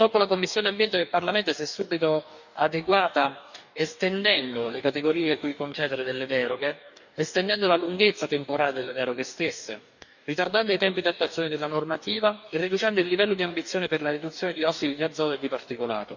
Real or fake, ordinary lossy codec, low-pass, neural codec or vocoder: fake; none; 7.2 kHz; codec, 44.1 kHz, 2.6 kbps, DAC